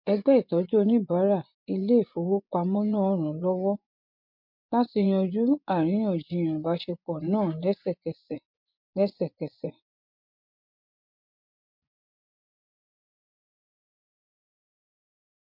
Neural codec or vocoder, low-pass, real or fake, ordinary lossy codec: vocoder, 24 kHz, 100 mel bands, Vocos; 5.4 kHz; fake; MP3, 48 kbps